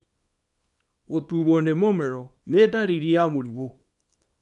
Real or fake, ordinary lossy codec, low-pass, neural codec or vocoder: fake; none; 10.8 kHz; codec, 24 kHz, 0.9 kbps, WavTokenizer, small release